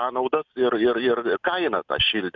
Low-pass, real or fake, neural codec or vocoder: 7.2 kHz; real; none